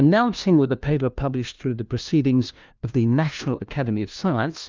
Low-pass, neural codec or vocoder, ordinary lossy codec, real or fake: 7.2 kHz; codec, 16 kHz, 1 kbps, FunCodec, trained on LibriTTS, 50 frames a second; Opus, 24 kbps; fake